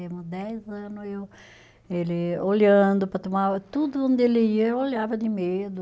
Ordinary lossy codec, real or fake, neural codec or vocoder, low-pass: none; real; none; none